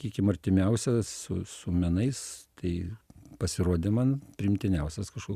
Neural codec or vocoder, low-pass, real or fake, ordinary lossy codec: none; 14.4 kHz; real; Opus, 64 kbps